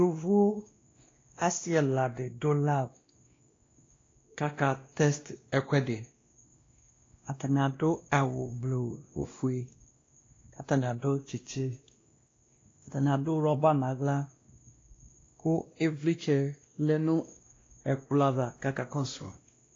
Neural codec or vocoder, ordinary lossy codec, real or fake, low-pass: codec, 16 kHz, 1 kbps, X-Codec, WavLM features, trained on Multilingual LibriSpeech; AAC, 32 kbps; fake; 7.2 kHz